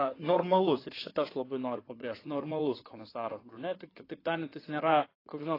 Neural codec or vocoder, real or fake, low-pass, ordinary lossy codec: codec, 16 kHz in and 24 kHz out, 2.2 kbps, FireRedTTS-2 codec; fake; 5.4 kHz; AAC, 24 kbps